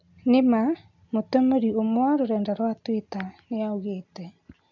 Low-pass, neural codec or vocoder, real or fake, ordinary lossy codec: 7.2 kHz; none; real; AAC, 48 kbps